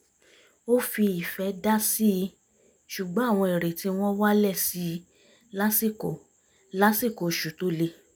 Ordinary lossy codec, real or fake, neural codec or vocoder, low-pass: none; real; none; none